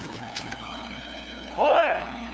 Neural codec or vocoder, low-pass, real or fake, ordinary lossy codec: codec, 16 kHz, 2 kbps, FunCodec, trained on LibriTTS, 25 frames a second; none; fake; none